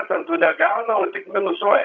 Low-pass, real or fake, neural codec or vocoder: 7.2 kHz; fake; vocoder, 22.05 kHz, 80 mel bands, HiFi-GAN